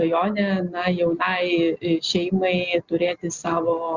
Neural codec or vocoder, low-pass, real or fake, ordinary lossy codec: none; 7.2 kHz; real; Opus, 64 kbps